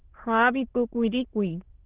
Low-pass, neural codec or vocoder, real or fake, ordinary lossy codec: 3.6 kHz; autoencoder, 22.05 kHz, a latent of 192 numbers a frame, VITS, trained on many speakers; fake; Opus, 16 kbps